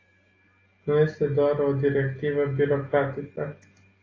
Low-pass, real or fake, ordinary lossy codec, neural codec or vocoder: 7.2 kHz; real; AAC, 32 kbps; none